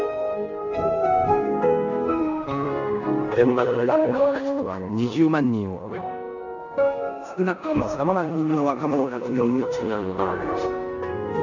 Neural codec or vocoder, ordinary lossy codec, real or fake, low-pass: codec, 16 kHz in and 24 kHz out, 0.9 kbps, LongCat-Audio-Codec, four codebook decoder; none; fake; 7.2 kHz